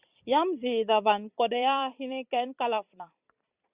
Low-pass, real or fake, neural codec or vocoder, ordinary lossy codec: 3.6 kHz; fake; vocoder, 44.1 kHz, 128 mel bands every 256 samples, BigVGAN v2; Opus, 64 kbps